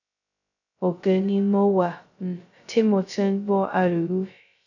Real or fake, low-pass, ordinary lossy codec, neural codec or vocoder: fake; 7.2 kHz; none; codec, 16 kHz, 0.2 kbps, FocalCodec